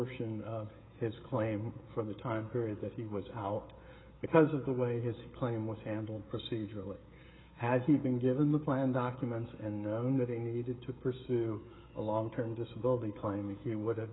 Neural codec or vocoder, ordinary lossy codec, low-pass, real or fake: codec, 16 kHz, 16 kbps, FreqCodec, smaller model; AAC, 16 kbps; 7.2 kHz; fake